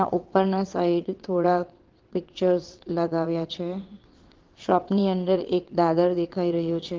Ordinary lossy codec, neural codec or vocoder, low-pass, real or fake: Opus, 16 kbps; vocoder, 22.05 kHz, 80 mel bands, WaveNeXt; 7.2 kHz; fake